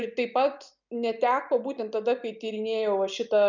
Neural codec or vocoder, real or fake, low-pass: none; real; 7.2 kHz